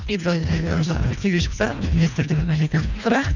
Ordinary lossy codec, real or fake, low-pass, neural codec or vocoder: none; fake; 7.2 kHz; codec, 24 kHz, 1.5 kbps, HILCodec